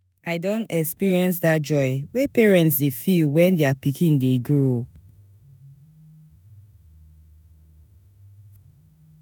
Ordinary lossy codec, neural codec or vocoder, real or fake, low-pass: none; autoencoder, 48 kHz, 32 numbers a frame, DAC-VAE, trained on Japanese speech; fake; none